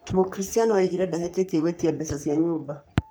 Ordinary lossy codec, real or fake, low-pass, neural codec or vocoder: none; fake; none; codec, 44.1 kHz, 3.4 kbps, Pupu-Codec